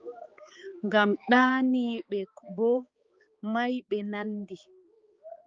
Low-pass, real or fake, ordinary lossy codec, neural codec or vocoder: 7.2 kHz; fake; Opus, 32 kbps; codec, 16 kHz, 4 kbps, X-Codec, HuBERT features, trained on balanced general audio